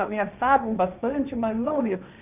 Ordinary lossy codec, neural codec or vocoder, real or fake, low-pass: none; codec, 16 kHz, 1.1 kbps, Voila-Tokenizer; fake; 3.6 kHz